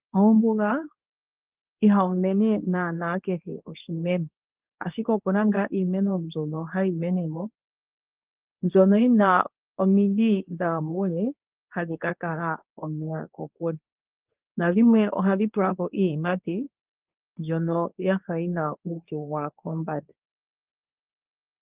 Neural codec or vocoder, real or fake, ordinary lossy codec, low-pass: codec, 24 kHz, 0.9 kbps, WavTokenizer, medium speech release version 2; fake; Opus, 32 kbps; 3.6 kHz